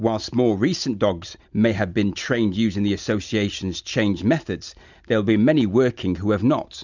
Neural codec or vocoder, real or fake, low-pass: none; real; 7.2 kHz